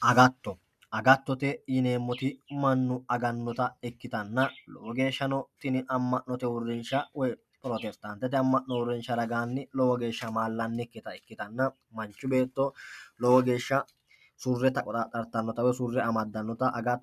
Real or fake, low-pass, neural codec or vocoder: real; 14.4 kHz; none